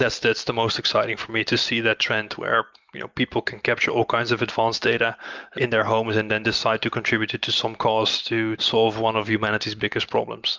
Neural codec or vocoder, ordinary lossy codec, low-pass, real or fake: none; Opus, 24 kbps; 7.2 kHz; real